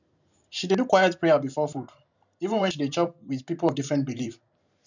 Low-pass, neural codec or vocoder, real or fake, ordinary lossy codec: 7.2 kHz; vocoder, 44.1 kHz, 128 mel bands every 512 samples, BigVGAN v2; fake; none